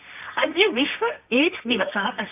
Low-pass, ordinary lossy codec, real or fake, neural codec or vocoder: 3.6 kHz; none; fake; codec, 24 kHz, 0.9 kbps, WavTokenizer, medium music audio release